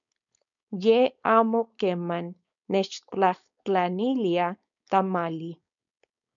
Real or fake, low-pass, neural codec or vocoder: fake; 7.2 kHz; codec, 16 kHz, 4.8 kbps, FACodec